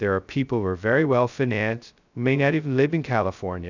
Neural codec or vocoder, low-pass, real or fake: codec, 16 kHz, 0.2 kbps, FocalCodec; 7.2 kHz; fake